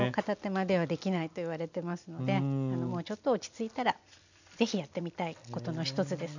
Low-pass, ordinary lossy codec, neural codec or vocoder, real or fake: 7.2 kHz; none; none; real